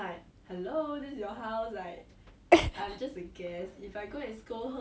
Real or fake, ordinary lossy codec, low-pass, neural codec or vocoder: real; none; none; none